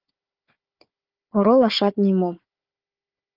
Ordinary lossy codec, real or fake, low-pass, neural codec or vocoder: Opus, 32 kbps; fake; 5.4 kHz; codec, 16 kHz, 4 kbps, FunCodec, trained on Chinese and English, 50 frames a second